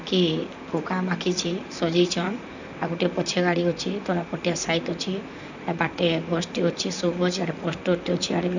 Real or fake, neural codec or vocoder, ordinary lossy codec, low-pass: fake; vocoder, 44.1 kHz, 128 mel bands, Pupu-Vocoder; none; 7.2 kHz